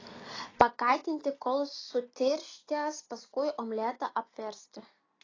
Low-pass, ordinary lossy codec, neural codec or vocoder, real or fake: 7.2 kHz; AAC, 32 kbps; none; real